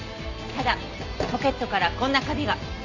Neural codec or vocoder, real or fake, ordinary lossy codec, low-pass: none; real; none; 7.2 kHz